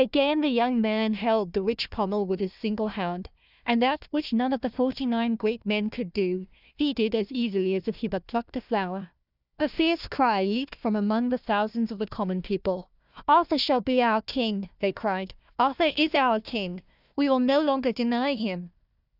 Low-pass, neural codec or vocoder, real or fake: 5.4 kHz; codec, 16 kHz, 1 kbps, FunCodec, trained on Chinese and English, 50 frames a second; fake